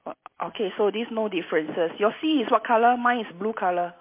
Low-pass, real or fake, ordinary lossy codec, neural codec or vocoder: 3.6 kHz; real; MP3, 24 kbps; none